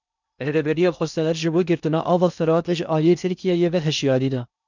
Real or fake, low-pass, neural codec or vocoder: fake; 7.2 kHz; codec, 16 kHz in and 24 kHz out, 0.6 kbps, FocalCodec, streaming, 2048 codes